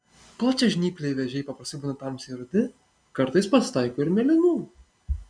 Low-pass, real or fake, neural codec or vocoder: 9.9 kHz; real; none